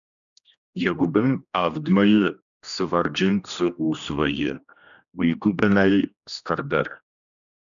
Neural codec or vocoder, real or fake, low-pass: codec, 16 kHz, 1 kbps, X-Codec, HuBERT features, trained on general audio; fake; 7.2 kHz